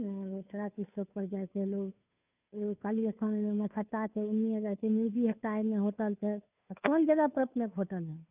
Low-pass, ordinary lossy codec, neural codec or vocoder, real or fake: 3.6 kHz; none; codec, 16 kHz, 2 kbps, FunCodec, trained on Chinese and English, 25 frames a second; fake